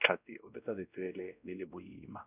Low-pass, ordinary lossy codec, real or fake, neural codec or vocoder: 3.6 kHz; none; fake; codec, 16 kHz, 1 kbps, X-Codec, WavLM features, trained on Multilingual LibriSpeech